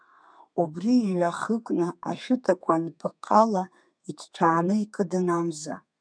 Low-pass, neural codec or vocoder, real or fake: 9.9 kHz; codec, 32 kHz, 1.9 kbps, SNAC; fake